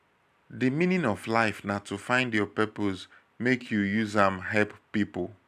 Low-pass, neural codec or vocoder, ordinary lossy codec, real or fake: 14.4 kHz; none; none; real